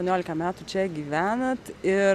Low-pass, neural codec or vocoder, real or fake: 14.4 kHz; none; real